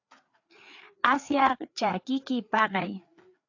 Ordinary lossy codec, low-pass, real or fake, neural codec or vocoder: AAC, 48 kbps; 7.2 kHz; fake; codec, 16 kHz, 4 kbps, FreqCodec, larger model